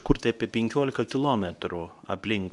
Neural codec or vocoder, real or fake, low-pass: codec, 24 kHz, 0.9 kbps, WavTokenizer, medium speech release version 2; fake; 10.8 kHz